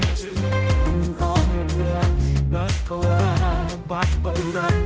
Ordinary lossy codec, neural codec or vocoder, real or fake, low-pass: none; codec, 16 kHz, 0.5 kbps, X-Codec, HuBERT features, trained on general audio; fake; none